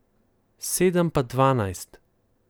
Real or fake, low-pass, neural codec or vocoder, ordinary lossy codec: real; none; none; none